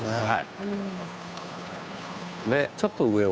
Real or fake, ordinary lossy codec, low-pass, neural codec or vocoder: fake; none; none; codec, 16 kHz, 2 kbps, FunCodec, trained on Chinese and English, 25 frames a second